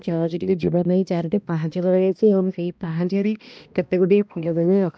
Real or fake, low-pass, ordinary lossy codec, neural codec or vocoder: fake; none; none; codec, 16 kHz, 1 kbps, X-Codec, HuBERT features, trained on balanced general audio